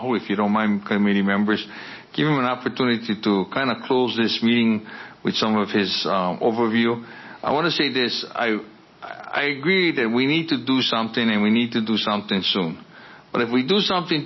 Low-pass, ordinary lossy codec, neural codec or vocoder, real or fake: 7.2 kHz; MP3, 24 kbps; none; real